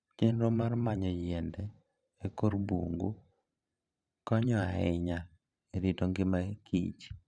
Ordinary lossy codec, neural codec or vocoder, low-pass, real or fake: none; vocoder, 44.1 kHz, 128 mel bands every 512 samples, BigVGAN v2; 9.9 kHz; fake